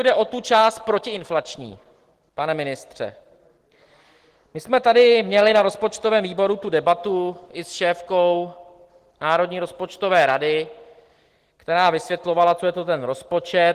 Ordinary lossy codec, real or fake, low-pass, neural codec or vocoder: Opus, 16 kbps; real; 14.4 kHz; none